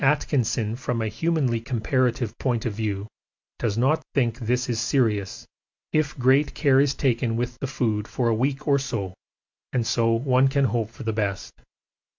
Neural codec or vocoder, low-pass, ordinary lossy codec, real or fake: none; 7.2 kHz; MP3, 64 kbps; real